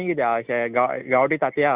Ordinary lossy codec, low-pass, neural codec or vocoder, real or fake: Opus, 64 kbps; 3.6 kHz; none; real